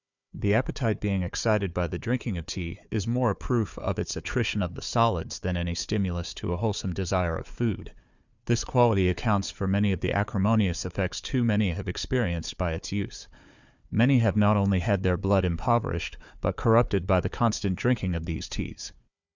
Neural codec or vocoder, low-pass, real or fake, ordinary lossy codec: codec, 16 kHz, 4 kbps, FunCodec, trained on Chinese and English, 50 frames a second; 7.2 kHz; fake; Opus, 64 kbps